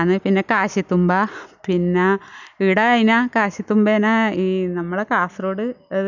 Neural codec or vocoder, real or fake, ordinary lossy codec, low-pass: none; real; none; 7.2 kHz